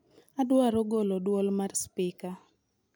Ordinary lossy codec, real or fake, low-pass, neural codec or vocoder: none; real; none; none